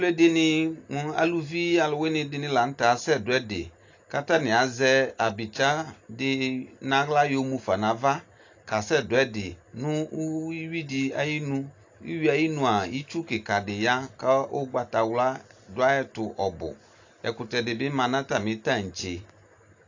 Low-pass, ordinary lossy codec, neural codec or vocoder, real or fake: 7.2 kHz; AAC, 48 kbps; none; real